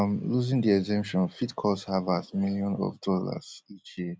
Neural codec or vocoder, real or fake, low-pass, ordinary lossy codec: none; real; none; none